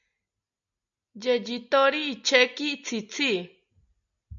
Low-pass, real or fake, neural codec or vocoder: 7.2 kHz; real; none